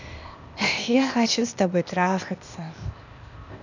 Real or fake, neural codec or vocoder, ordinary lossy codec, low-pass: fake; codec, 16 kHz, 0.8 kbps, ZipCodec; none; 7.2 kHz